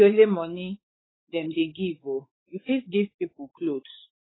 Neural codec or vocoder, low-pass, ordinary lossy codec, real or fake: codec, 16 kHz, 4 kbps, X-Codec, WavLM features, trained on Multilingual LibriSpeech; 7.2 kHz; AAC, 16 kbps; fake